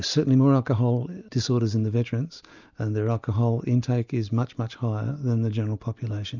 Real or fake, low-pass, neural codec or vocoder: real; 7.2 kHz; none